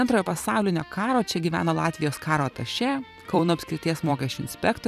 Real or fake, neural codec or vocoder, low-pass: fake; vocoder, 44.1 kHz, 128 mel bands every 256 samples, BigVGAN v2; 14.4 kHz